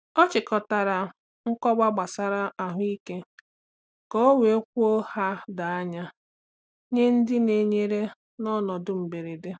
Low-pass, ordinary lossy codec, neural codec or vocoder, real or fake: none; none; none; real